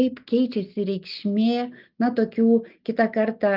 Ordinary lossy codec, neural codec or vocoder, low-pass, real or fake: Opus, 24 kbps; none; 5.4 kHz; real